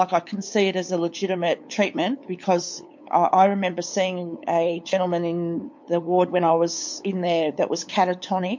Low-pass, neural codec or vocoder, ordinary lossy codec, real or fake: 7.2 kHz; codec, 16 kHz, 8 kbps, FunCodec, trained on LibriTTS, 25 frames a second; MP3, 48 kbps; fake